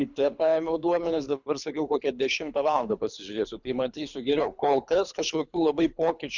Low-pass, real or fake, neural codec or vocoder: 7.2 kHz; fake; codec, 24 kHz, 3 kbps, HILCodec